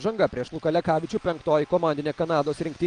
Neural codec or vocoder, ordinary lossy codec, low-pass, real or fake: none; Opus, 32 kbps; 9.9 kHz; real